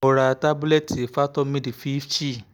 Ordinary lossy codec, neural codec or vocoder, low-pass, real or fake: none; none; none; real